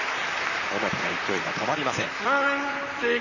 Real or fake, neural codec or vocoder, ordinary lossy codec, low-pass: fake; vocoder, 44.1 kHz, 128 mel bands, Pupu-Vocoder; none; 7.2 kHz